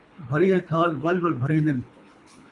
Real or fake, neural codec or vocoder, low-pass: fake; codec, 24 kHz, 3 kbps, HILCodec; 10.8 kHz